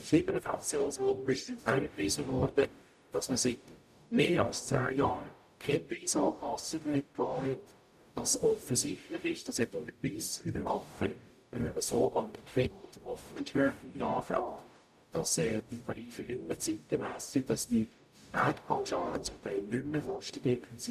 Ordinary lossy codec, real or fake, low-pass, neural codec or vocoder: none; fake; 14.4 kHz; codec, 44.1 kHz, 0.9 kbps, DAC